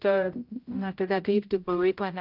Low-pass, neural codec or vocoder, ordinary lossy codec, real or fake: 5.4 kHz; codec, 16 kHz, 0.5 kbps, X-Codec, HuBERT features, trained on general audio; Opus, 32 kbps; fake